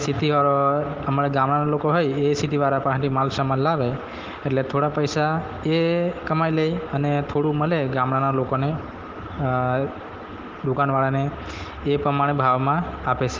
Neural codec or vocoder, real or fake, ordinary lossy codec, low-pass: none; real; Opus, 16 kbps; 7.2 kHz